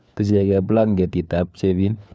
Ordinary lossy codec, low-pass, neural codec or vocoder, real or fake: none; none; codec, 16 kHz, 4 kbps, FunCodec, trained on LibriTTS, 50 frames a second; fake